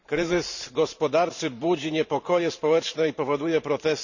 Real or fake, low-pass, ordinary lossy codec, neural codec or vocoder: fake; 7.2 kHz; none; vocoder, 44.1 kHz, 128 mel bands every 512 samples, BigVGAN v2